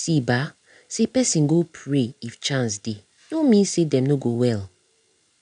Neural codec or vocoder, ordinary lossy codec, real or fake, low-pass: none; none; real; 9.9 kHz